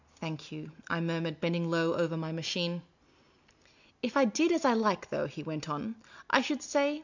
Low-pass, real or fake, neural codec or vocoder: 7.2 kHz; real; none